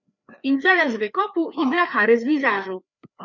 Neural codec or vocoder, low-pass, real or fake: codec, 16 kHz, 2 kbps, FreqCodec, larger model; 7.2 kHz; fake